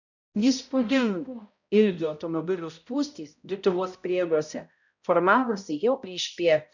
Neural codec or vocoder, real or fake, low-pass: codec, 16 kHz, 0.5 kbps, X-Codec, HuBERT features, trained on balanced general audio; fake; 7.2 kHz